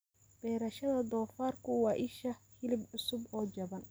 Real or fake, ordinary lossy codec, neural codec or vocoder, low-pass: real; none; none; none